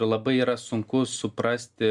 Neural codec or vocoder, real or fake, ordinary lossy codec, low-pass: none; real; Opus, 64 kbps; 10.8 kHz